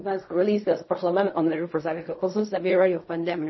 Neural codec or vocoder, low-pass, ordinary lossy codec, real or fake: codec, 16 kHz in and 24 kHz out, 0.4 kbps, LongCat-Audio-Codec, fine tuned four codebook decoder; 7.2 kHz; MP3, 24 kbps; fake